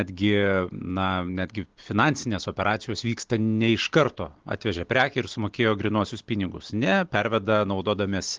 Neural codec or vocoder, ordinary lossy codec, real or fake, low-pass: none; Opus, 16 kbps; real; 7.2 kHz